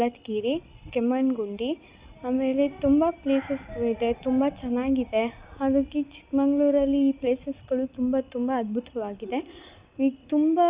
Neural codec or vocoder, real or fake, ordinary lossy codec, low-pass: none; real; Opus, 64 kbps; 3.6 kHz